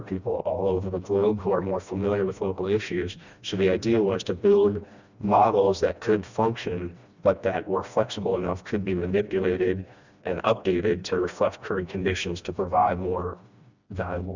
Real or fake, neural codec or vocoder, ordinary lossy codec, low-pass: fake; codec, 16 kHz, 1 kbps, FreqCodec, smaller model; Opus, 64 kbps; 7.2 kHz